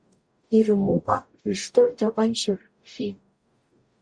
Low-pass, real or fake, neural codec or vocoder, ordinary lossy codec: 9.9 kHz; fake; codec, 44.1 kHz, 0.9 kbps, DAC; Opus, 64 kbps